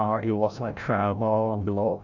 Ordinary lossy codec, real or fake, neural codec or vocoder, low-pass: none; fake; codec, 16 kHz, 0.5 kbps, FreqCodec, larger model; 7.2 kHz